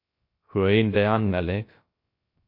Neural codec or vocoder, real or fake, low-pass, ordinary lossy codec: codec, 16 kHz, 0.3 kbps, FocalCodec; fake; 5.4 kHz; MP3, 32 kbps